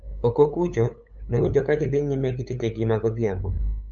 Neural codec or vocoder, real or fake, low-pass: codec, 16 kHz, 8 kbps, FunCodec, trained on LibriTTS, 25 frames a second; fake; 7.2 kHz